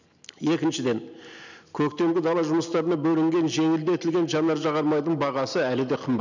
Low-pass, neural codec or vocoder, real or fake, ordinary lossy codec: 7.2 kHz; none; real; none